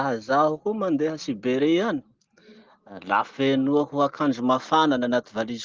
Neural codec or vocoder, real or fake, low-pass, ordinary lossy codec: none; real; 7.2 kHz; Opus, 16 kbps